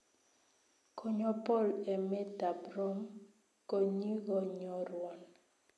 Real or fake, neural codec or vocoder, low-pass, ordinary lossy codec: real; none; none; none